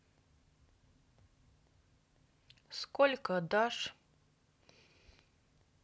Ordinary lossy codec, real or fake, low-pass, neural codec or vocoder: none; real; none; none